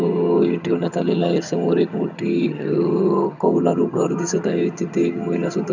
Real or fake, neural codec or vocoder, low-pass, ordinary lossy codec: fake; vocoder, 22.05 kHz, 80 mel bands, HiFi-GAN; 7.2 kHz; none